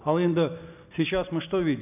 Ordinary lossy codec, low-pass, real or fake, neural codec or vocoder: none; 3.6 kHz; real; none